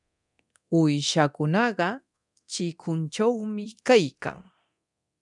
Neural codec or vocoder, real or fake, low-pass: codec, 24 kHz, 0.9 kbps, DualCodec; fake; 10.8 kHz